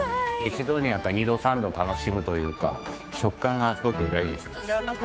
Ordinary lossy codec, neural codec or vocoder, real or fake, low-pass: none; codec, 16 kHz, 4 kbps, X-Codec, HuBERT features, trained on general audio; fake; none